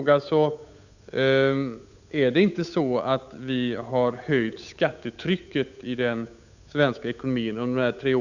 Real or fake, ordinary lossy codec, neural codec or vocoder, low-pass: fake; none; codec, 16 kHz, 8 kbps, FunCodec, trained on Chinese and English, 25 frames a second; 7.2 kHz